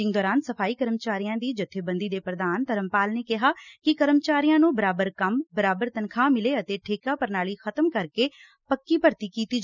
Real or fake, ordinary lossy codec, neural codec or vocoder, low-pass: real; none; none; 7.2 kHz